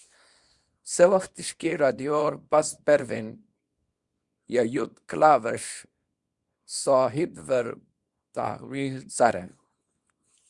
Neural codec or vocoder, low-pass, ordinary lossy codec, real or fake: codec, 24 kHz, 0.9 kbps, WavTokenizer, small release; 10.8 kHz; Opus, 64 kbps; fake